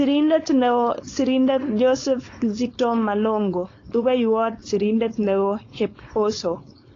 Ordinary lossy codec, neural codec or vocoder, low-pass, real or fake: AAC, 32 kbps; codec, 16 kHz, 4.8 kbps, FACodec; 7.2 kHz; fake